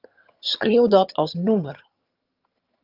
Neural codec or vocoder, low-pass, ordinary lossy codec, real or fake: vocoder, 22.05 kHz, 80 mel bands, HiFi-GAN; 5.4 kHz; Opus, 32 kbps; fake